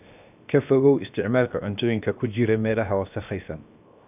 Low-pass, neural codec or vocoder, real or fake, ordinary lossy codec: 3.6 kHz; codec, 16 kHz, 0.7 kbps, FocalCodec; fake; none